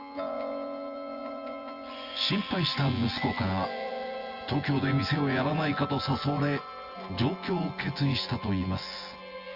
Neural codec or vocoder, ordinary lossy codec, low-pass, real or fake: vocoder, 24 kHz, 100 mel bands, Vocos; Opus, 32 kbps; 5.4 kHz; fake